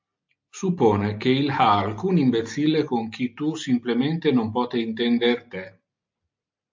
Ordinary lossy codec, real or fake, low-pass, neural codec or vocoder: MP3, 64 kbps; real; 7.2 kHz; none